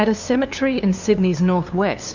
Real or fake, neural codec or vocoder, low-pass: fake; codec, 16 kHz, 2 kbps, FunCodec, trained on LibriTTS, 25 frames a second; 7.2 kHz